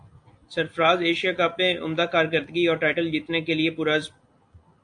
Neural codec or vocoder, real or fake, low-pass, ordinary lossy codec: none; real; 9.9 kHz; AAC, 64 kbps